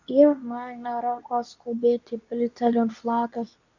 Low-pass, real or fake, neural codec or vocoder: 7.2 kHz; fake; codec, 24 kHz, 0.9 kbps, WavTokenizer, medium speech release version 2